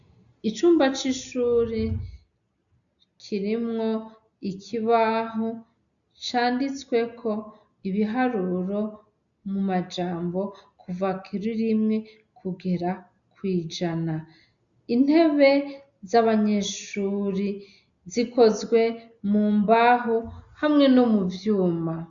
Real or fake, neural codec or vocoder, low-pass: real; none; 7.2 kHz